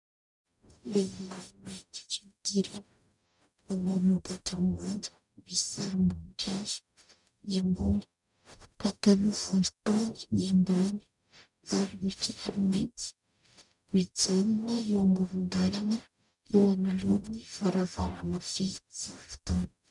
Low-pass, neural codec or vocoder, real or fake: 10.8 kHz; codec, 44.1 kHz, 0.9 kbps, DAC; fake